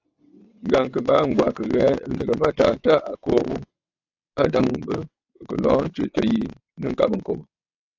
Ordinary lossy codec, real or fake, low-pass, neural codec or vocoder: AAC, 48 kbps; fake; 7.2 kHz; vocoder, 22.05 kHz, 80 mel bands, WaveNeXt